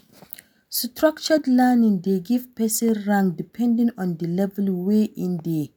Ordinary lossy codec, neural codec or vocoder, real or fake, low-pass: none; none; real; none